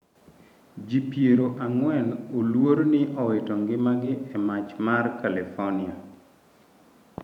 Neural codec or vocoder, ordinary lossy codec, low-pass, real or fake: vocoder, 44.1 kHz, 128 mel bands every 512 samples, BigVGAN v2; none; 19.8 kHz; fake